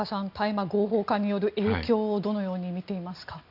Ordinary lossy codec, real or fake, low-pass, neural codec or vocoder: none; real; 5.4 kHz; none